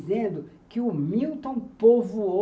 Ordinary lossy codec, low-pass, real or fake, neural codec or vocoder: none; none; real; none